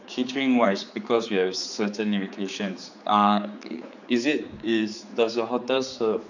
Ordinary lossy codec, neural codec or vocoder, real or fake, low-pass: none; codec, 16 kHz, 4 kbps, X-Codec, HuBERT features, trained on general audio; fake; 7.2 kHz